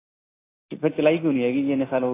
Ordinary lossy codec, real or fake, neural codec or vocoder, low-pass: AAC, 16 kbps; real; none; 3.6 kHz